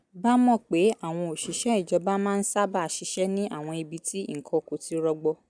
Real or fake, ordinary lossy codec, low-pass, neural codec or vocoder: real; none; 9.9 kHz; none